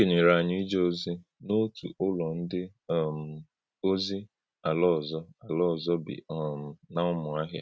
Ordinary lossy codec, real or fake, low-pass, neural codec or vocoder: none; real; none; none